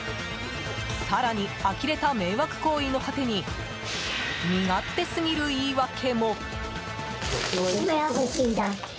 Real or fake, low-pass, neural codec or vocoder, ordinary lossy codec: real; none; none; none